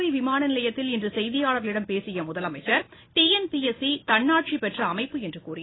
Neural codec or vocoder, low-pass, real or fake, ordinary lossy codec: none; 7.2 kHz; real; AAC, 16 kbps